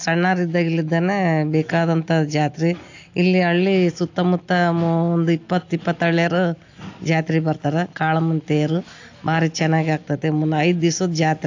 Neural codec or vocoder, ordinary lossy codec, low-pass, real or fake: none; AAC, 48 kbps; 7.2 kHz; real